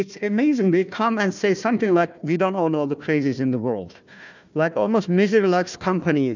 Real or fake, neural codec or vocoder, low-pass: fake; codec, 16 kHz, 1 kbps, FunCodec, trained on Chinese and English, 50 frames a second; 7.2 kHz